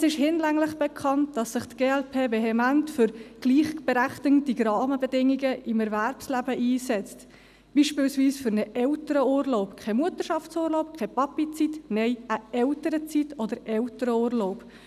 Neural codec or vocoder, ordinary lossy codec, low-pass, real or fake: none; none; 14.4 kHz; real